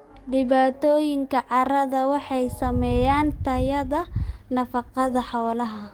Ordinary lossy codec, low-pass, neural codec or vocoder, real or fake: Opus, 24 kbps; 19.8 kHz; codec, 44.1 kHz, 7.8 kbps, DAC; fake